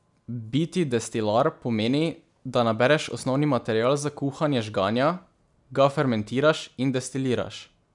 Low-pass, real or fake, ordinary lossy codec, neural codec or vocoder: 10.8 kHz; real; none; none